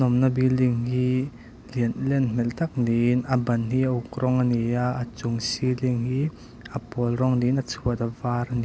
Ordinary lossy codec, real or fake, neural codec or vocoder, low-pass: none; real; none; none